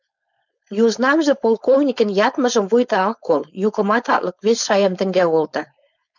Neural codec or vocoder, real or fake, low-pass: codec, 16 kHz, 4.8 kbps, FACodec; fake; 7.2 kHz